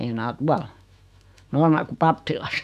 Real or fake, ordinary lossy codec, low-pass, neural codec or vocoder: fake; none; 14.4 kHz; autoencoder, 48 kHz, 128 numbers a frame, DAC-VAE, trained on Japanese speech